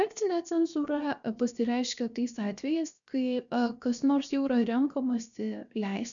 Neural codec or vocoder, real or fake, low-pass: codec, 16 kHz, 0.7 kbps, FocalCodec; fake; 7.2 kHz